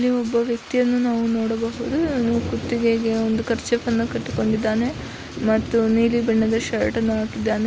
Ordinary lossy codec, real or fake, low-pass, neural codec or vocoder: none; real; none; none